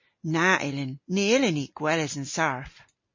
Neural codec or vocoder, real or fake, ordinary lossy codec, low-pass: none; real; MP3, 32 kbps; 7.2 kHz